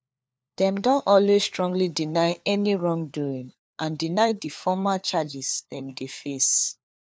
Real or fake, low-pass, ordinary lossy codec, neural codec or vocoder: fake; none; none; codec, 16 kHz, 4 kbps, FunCodec, trained on LibriTTS, 50 frames a second